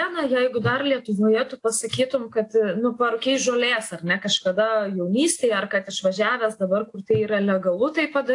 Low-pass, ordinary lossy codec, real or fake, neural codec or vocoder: 10.8 kHz; AAC, 48 kbps; real; none